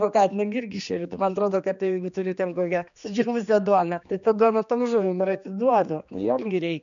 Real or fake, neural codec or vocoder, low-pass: fake; codec, 16 kHz, 2 kbps, X-Codec, HuBERT features, trained on general audio; 7.2 kHz